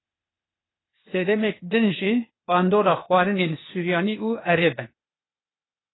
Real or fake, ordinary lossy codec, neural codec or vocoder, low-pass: fake; AAC, 16 kbps; codec, 16 kHz, 0.8 kbps, ZipCodec; 7.2 kHz